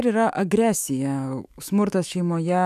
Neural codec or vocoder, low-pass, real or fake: autoencoder, 48 kHz, 128 numbers a frame, DAC-VAE, trained on Japanese speech; 14.4 kHz; fake